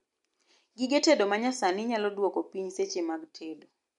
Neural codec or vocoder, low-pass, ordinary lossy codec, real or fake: none; 10.8 kHz; MP3, 64 kbps; real